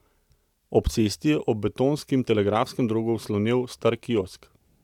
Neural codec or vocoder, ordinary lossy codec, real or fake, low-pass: none; none; real; 19.8 kHz